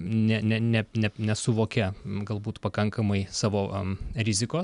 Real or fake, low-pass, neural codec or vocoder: real; 10.8 kHz; none